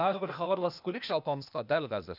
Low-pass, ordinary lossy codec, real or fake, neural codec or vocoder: 5.4 kHz; none; fake; codec, 16 kHz, 0.8 kbps, ZipCodec